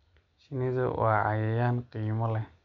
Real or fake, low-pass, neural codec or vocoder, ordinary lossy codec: real; 7.2 kHz; none; none